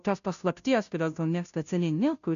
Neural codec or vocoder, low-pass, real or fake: codec, 16 kHz, 0.5 kbps, FunCodec, trained on Chinese and English, 25 frames a second; 7.2 kHz; fake